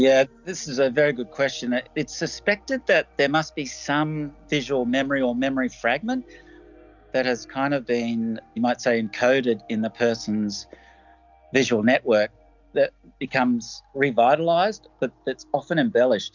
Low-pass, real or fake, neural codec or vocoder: 7.2 kHz; real; none